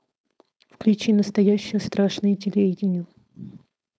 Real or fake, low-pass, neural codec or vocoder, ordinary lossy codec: fake; none; codec, 16 kHz, 4.8 kbps, FACodec; none